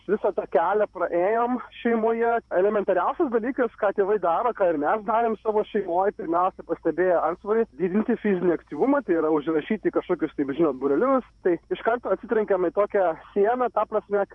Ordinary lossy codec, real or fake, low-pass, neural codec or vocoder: MP3, 96 kbps; fake; 10.8 kHz; vocoder, 48 kHz, 128 mel bands, Vocos